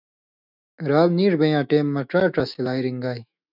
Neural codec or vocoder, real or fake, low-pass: autoencoder, 48 kHz, 128 numbers a frame, DAC-VAE, trained on Japanese speech; fake; 5.4 kHz